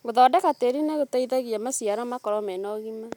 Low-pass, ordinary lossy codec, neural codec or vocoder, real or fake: 19.8 kHz; none; none; real